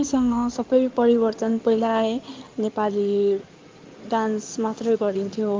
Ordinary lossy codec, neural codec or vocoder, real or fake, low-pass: Opus, 32 kbps; codec, 16 kHz in and 24 kHz out, 2.2 kbps, FireRedTTS-2 codec; fake; 7.2 kHz